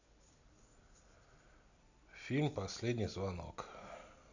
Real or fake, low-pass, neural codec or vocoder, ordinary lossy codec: real; 7.2 kHz; none; none